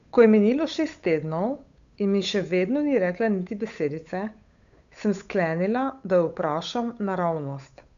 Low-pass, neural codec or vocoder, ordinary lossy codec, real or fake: 7.2 kHz; codec, 16 kHz, 8 kbps, FunCodec, trained on Chinese and English, 25 frames a second; none; fake